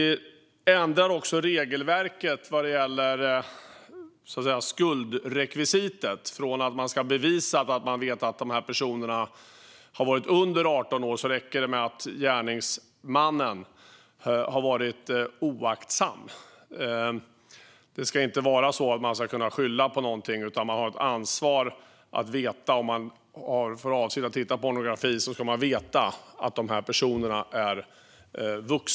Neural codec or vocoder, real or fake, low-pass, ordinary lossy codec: none; real; none; none